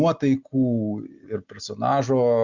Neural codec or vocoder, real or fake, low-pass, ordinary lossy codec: none; real; 7.2 kHz; Opus, 64 kbps